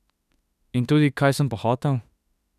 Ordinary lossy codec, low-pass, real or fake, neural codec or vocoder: none; 14.4 kHz; fake; autoencoder, 48 kHz, 32 numbers a frame, DAC-VAE, trained on Japanese speech